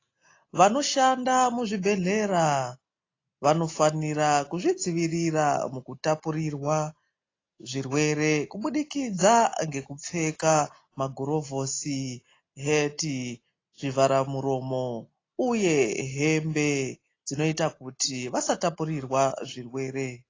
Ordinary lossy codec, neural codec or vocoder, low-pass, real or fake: AAC, 32 kbps; none; 7.2 kHz; real